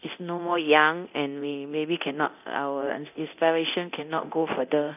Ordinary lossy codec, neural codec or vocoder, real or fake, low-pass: none; codec, 24 kHz, 0.9 kbps, DualCodec; fake; 3.6 kHz